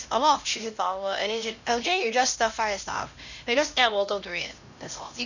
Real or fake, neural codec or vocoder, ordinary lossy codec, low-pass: fake; codec, 16 kHz, 1 kbps, X-Codec, HuBERT features, trained on LibriSpeech; none; 7.2 kHz